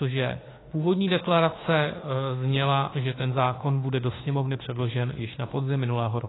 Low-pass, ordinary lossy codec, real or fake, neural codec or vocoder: 7.2 kHz; AAC, 16 kbps; fake; autoencoder, 48 kHz, 32 numbers a frame, DAC-VAE, trained on Japanese speech